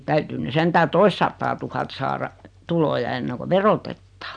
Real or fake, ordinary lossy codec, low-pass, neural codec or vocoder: real; none; 9.9 kHz; none